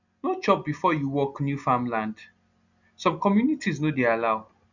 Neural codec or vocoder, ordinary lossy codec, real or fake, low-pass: none; none; real; 7.2 kHz